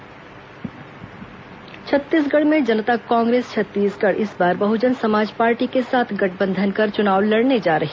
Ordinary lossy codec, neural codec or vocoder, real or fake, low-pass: none; none; real; none